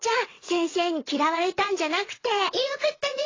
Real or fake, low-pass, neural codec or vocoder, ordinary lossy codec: fake; 7.2 kHz; vocoder, 22.05 kHz, 80 mel bands, WaveNeXt; AAC, 32 kbps